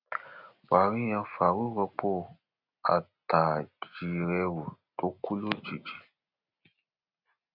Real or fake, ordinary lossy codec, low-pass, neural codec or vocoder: real; none; 5.4 kHz; none